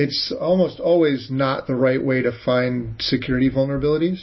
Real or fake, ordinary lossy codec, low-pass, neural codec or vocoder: real; MP3, 24 kbps; 7.2 kHz; none